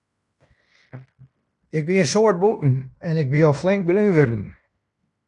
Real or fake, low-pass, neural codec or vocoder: fake; 10.8 kHz; codec, 16 kHz in and 24 kHz out, 0.9 kbps, LongCat-Audio-Codec, fine tuned four codebook decoder